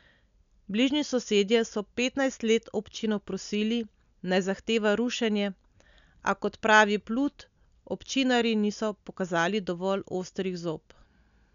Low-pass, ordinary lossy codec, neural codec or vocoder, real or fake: 7.2 kHz; none; none; real